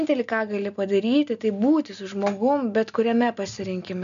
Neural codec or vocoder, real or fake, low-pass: none; real; 7.2 kHz